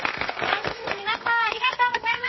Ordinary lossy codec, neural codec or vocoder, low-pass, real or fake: MP3, 24 kbps; codec, 32 kHz, 1.9 kbps, SNAC; 7.2 kHz; fake